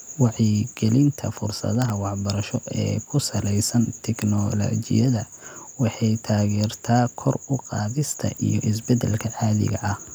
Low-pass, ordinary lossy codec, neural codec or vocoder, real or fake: none; none; none; real